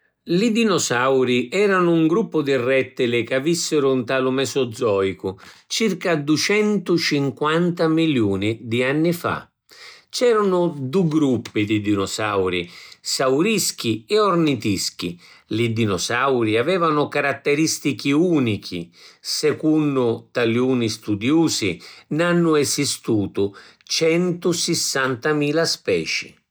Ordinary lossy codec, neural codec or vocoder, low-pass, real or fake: none; none; none; real